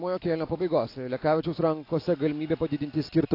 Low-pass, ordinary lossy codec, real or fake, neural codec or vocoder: 5.4 kHz; AAC, 32 kbps; real; none